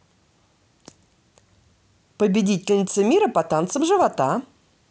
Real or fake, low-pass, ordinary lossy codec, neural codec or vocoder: real; none; none; none